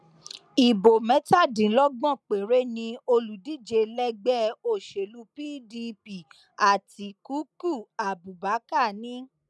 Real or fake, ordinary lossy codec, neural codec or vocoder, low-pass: real; none; none; none